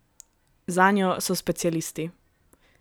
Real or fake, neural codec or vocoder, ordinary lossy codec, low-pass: real; none; none; none